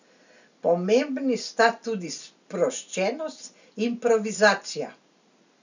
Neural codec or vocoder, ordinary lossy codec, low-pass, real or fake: none; none; 7.2 kHz; real